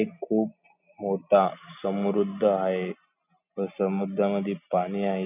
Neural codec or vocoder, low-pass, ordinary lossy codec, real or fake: none; 3.6 kHz; none; real